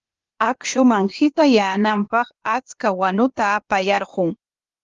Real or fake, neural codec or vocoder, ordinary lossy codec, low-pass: fake; codec, 16 kHz, 0.8 kbps, ZipCodec; Opus, 32 kbps; 7.2 kHz